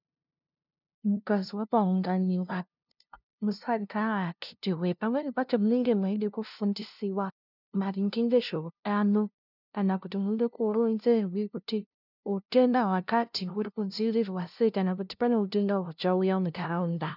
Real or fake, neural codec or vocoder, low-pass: fake; codec, 16 kHz, 0.5 kbps, FunCodec, trained on LibriTTS, 25 frames a second; 5.4 kHz